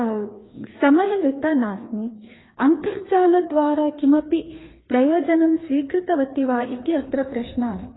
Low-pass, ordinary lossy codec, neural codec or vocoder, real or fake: 7.2 kHz; AAC, 16 kbps; codec, 16 kHz, 2 kbps, FreqCodec, larger model; fake